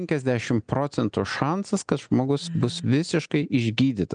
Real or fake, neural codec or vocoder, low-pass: real; none; 9.9 kHz